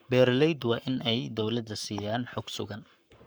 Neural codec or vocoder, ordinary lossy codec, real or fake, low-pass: codec, 44.1 kHz, 7.8 kbps, Pupu-Codec; none; fake; none